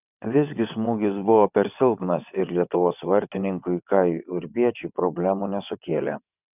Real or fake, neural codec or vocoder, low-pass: fake; vocoder, 22.05 kHz, 80 mel bands, WaveNeXt; 3.6 kHz